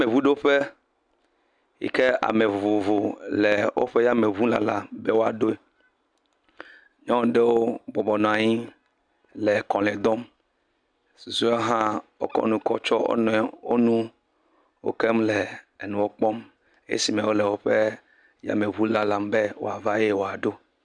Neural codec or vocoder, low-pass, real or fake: none; 9.9 kHz; real